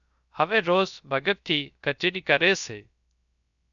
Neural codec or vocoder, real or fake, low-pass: codec, 16 kHz, 0.3 kbps, FocalCodec; fake; 7.2 kHz